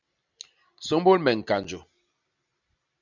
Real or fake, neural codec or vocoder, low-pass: real; none; 7.2 kHz